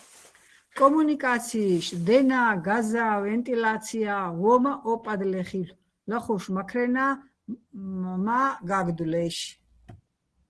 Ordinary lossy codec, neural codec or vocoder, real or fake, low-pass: Opus, 16 kbps; none; real; 10.8 kHz